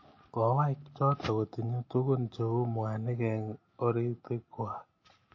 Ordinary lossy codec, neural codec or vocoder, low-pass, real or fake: MP3, 32 kbps; none; 7.2 kHz; real